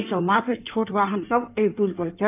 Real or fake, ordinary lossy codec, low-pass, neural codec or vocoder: fake; none; 3.6 kHz; codec, 16 kHz in and 24 kHz out, 1.1 kbps, FireRedTTS-2 codec